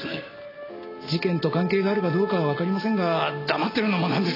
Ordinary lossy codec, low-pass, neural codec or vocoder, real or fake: AAC, 24 kbps; 5.4 kHz; none; real